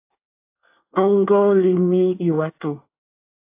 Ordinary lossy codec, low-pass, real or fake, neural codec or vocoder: AAC, 24 kbps; 3.6 kHz; fake; codec, 24 kHz, 1 kbps, SNAC